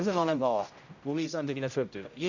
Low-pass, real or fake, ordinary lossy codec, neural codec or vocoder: 7.2 kHz; fake; none; codec, 16 kHz, 0.5 kbps, X-Codec, HuBERT features, trained on general audio